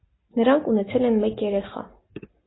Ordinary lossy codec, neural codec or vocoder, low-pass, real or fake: AAC, 16 kbps; none; 7.2 kHz; real